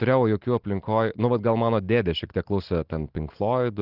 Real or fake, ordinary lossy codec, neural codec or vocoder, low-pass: real; Opus, 16 kbps; none; 5.4 kHz